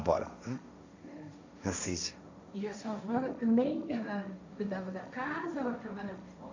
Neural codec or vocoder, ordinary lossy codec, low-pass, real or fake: codec, 16 kHz, 1.1 kbps, Voila-Tokenizer; AAC, 48 kbps; 7.2 kHz; fake